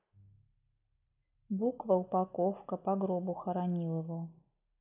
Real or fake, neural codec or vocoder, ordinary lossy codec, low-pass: real; none; none; 3.6 kHz